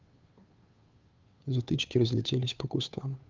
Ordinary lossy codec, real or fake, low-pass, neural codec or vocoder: Opus, 32 kbps; fake; 7.2 kHz; codec, 16 kHz, 2 kbps, FunCodec, trained on Chinese and English, 25 frames a second